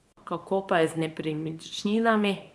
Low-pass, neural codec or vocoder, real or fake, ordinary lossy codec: none; codec, 24 kHz, 0.9 kbps, WavTokenizer, small release; fake; none